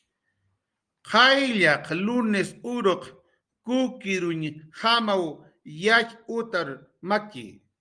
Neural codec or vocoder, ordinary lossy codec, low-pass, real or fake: none; Opus, 32 kbps; 9.9 kHz; real